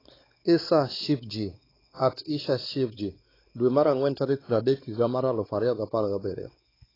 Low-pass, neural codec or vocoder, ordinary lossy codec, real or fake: 5.4 kHz; codec, 16 kHz, 4 kbps, X-Codec, WavLM features, trained on Multilingual LibriSpeech; AAC, 24 kbps; fake